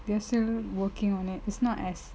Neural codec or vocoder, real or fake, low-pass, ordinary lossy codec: none; real; none; none